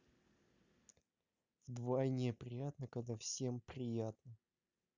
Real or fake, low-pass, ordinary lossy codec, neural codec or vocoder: real; 7.2 kHz; none; none